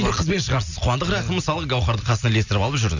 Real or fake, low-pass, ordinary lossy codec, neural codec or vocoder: real; 7.2 kHz; none; none